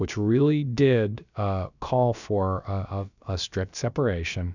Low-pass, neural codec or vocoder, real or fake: 7.2 kHz; codec, 16 kHz, about 1 kbps, DyCAST, with the encoder's durations; fake